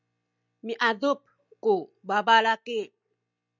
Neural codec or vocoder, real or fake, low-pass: none; real; 7.2 kHz